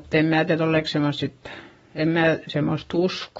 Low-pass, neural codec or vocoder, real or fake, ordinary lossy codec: 19.8 kHz; codec, 44.1 kHz, 7.8 kbps, Pupu-Codec; fake; AAC, 24 kbps